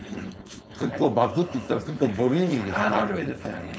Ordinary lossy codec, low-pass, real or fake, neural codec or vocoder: none; none; fake; codec, 16 kHz, 4.8 kbps, FACodec